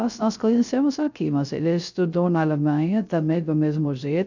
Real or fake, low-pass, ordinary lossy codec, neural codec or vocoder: fake; 7.2 kHz; none; codec, 16 kHz, 0.3 kbps, FocalCodec